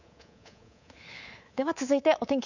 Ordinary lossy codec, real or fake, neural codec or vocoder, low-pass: none; fake; codec, 24 kHz, 3.1 kbps, DualCodec; 7.2 kHz